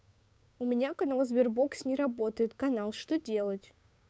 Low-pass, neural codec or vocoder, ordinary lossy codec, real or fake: none; codec, 16 kHz, 6 kbps, DAC; none; fake